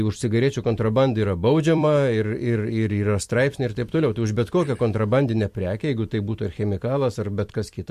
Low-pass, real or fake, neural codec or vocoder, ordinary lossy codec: 14.4 kHz; fake; vocoder, 44.1 kHz, 128 mel bands every 256 samples, BigVGAN v2; MP3, 64 kbps